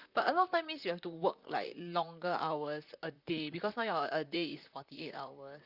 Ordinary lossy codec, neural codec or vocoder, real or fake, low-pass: Opus, 64 kbps; vocoder, 44.1 kHz, 128 mel bands, Pupu-Vocoder; fake; 5.4 kHz